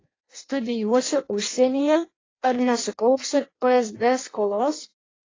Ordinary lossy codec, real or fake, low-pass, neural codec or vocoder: AAC, 32 kbps; fake; 7.2 kHz; codec, 16 kHz in and 24 kHz out, 0.6 kbps, FireRedTTS-2 codec